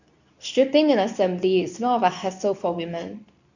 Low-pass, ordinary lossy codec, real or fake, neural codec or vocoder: 7.2 kHz; none; fake; codec, 24 kHz, 0.9 kbps, WavTokenizer, medium speech release version 2